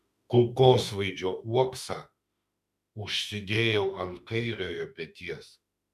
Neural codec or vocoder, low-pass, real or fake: autoencoder, 48 kHz, 32 numbers a frame, DAC-VAE, trained on Japanese speech; 14.4 kHz; fake